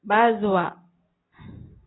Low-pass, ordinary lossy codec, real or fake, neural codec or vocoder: 7.2 kHz; AAC, 16 kbps; real; none